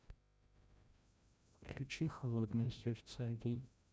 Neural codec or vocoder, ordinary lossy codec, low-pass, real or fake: codec, 16 kHz, 0.5 kbps, FreqCodec, larger model; none; none; fake